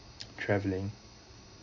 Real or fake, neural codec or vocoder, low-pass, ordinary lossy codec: real; none; 7.2 kHz; none